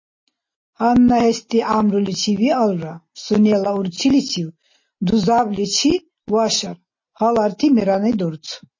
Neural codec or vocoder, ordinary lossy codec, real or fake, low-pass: none; MP3, 32 kbps; real; 7.2 kHz